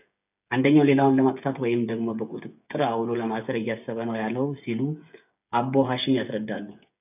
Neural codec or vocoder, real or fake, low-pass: codec, 16 kHz, 8 kbps, FreqCodec, smaller model; fake; 3.6 kHz